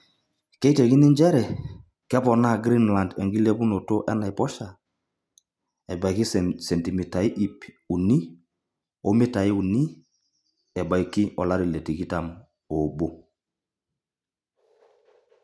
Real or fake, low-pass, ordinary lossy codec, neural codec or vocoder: real; none; none; none